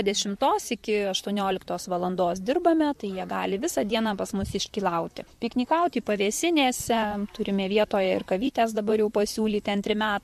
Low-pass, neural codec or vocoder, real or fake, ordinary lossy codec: 14.4 kHz; vocoder, 44.1 kHz, 128 mel bands, Pupu-Vocoder; fake; MP3, 64 kbps